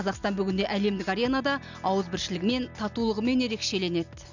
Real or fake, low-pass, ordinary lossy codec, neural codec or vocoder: real; 7.2 kHz; none; none